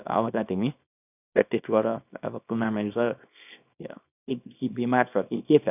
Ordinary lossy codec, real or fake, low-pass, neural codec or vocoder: none; fake; 3.6 kHz; codec, 24 kHz, 0.9 kbps, WavTokenizer, small release